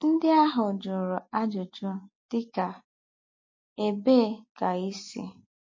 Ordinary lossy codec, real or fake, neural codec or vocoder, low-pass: MP3, 32 kbps; real; none; 7.2 kHz